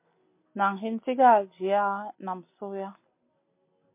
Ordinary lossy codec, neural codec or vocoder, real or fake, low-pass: MP3, 24 kbps; none; real; 3.6 kHz